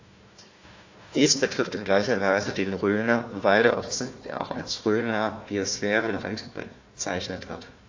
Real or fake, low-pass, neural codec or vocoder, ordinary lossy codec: fake; 7.2 kHz; codec, 16 kHz, 1 kbps, FunCodec, trained on Chinese and English, 50 frames a second; AAC, 48 kbps